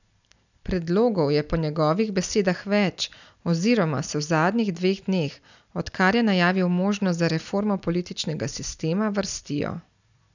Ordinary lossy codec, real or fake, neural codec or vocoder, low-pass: none; real; none; 7.2 kHz